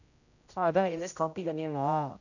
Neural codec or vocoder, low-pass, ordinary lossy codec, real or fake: codec, 16 kHz, 0.5 kbps, X-Codec, HuBERT features, trained on general audio; 7.2 kHz; none; fake